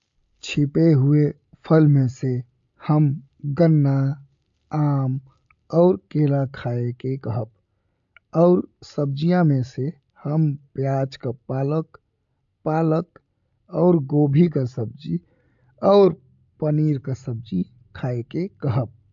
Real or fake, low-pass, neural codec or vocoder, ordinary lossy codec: real; 7.2 kHz; none; AAC, 64 kbps